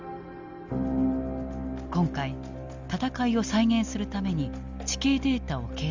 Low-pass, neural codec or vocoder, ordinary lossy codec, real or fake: 7.2 kHz; none; Opus, 32 kbps; real